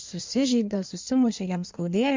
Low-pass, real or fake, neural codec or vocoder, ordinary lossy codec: 7.2 kHz; fake; codec, 16 kHz in and 24 kHz out, 1.1 kbps, FireRedTTS-2 codec; MP3, 64 kbps